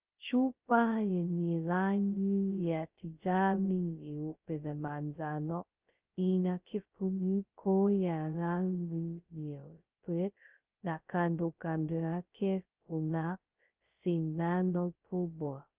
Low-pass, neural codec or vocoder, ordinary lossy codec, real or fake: 3.6 kHz; codec, 16 kHz, 0.2 kbps, FocalCodec; Opus, 24 kbps; fake